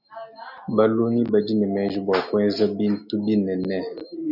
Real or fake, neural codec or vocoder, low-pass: real; none; 5.4 kHz